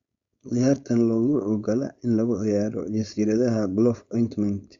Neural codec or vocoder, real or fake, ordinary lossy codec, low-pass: codec, 16 kHz, 4.8 kbps, FACodec; fake; Opus, 64 kbps; 7.2 kHz